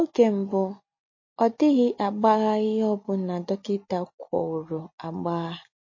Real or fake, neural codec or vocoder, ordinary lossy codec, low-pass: real; none; MP3, 32 kbps; 7.2 kHz